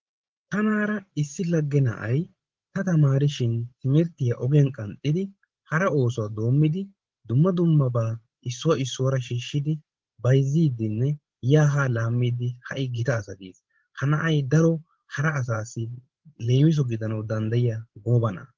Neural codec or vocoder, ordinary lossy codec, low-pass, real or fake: codec, 16 kHz, 16 kbps, FreqCodec, larger model; Opus, 16 kbps; 7.2 kHz; fake